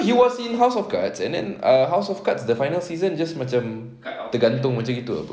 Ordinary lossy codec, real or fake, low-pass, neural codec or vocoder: none; real; none; none